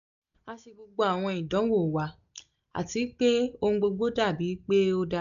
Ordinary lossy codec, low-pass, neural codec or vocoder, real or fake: none; 7.2 kHz; none; real